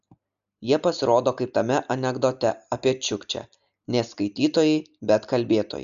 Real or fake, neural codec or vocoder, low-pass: real; none; 7.2 kHz